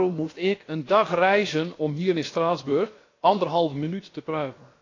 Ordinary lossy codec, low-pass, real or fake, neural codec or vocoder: AAC, 32 kbps; 7.2 kHz; fake; codec, 16 kHz, about 1 kbps, DyCAST, with the encoder's durations